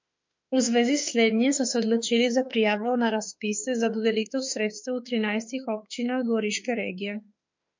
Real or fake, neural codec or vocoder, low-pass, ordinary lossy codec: fake; autoencoder, 48 kHz, 32 numbers a frame, DAC-VAE, trained on Japanese speech; 7.2 kHz; MP3, 48 kbps